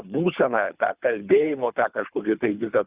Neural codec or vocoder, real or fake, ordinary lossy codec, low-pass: codec, 24 kHz, 3 kbps, HILCodec; fake; Opus, 64 kbps; 3.6 kHz